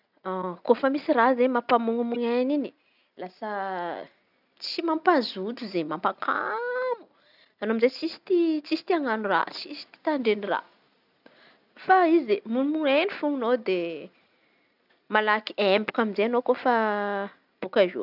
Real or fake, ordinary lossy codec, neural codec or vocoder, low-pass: real; none; none; 5.4 kHz